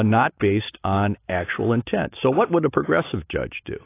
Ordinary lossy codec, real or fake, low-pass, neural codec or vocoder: AAC, 24 kbps; real; 3.6 kHz; none